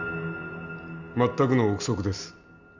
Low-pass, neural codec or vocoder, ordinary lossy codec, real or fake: 7.2 kHz; none; none; real